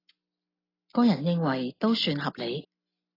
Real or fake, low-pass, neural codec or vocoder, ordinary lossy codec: real; 5.4 kHz; none; AAC, 24 kbps